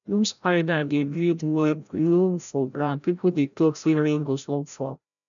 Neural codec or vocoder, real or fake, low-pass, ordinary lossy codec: codec, 16 kHz, 0.5 kbps, FreqCodec, larger model; fake; 7.2 kHz; none